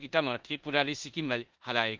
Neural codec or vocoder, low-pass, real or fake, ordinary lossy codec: codec, 16 kHz in and 24 kHz out, 0.9 kbps, LongCat-Audio-Codec, four codebook decoder; 7.2 kHz; fake; Opus, 32 kbps